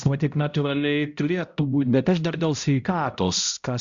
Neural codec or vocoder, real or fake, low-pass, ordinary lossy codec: codec, 16 kHz, 0.5 kbps, X-Codec, HuBERT features, trained on balanced general audio; fake; 7.2 kHz; Opus, 64 kbps